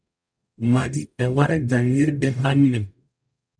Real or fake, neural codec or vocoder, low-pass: fake; codec, 44.1 kHz, 0.9 kbps, DAC; 9.9 kHz